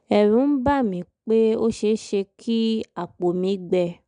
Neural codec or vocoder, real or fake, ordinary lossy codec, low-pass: none; real; none; 9.9 kHz